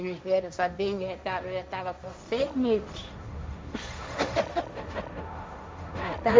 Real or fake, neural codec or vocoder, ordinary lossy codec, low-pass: fake; codec, 16 kHz, 1.1 kbps, Voila-Tokenizer; none; none